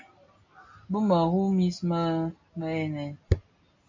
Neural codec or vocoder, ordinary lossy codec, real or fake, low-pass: none; MP3, 64 kbps; real; 7.2 kHz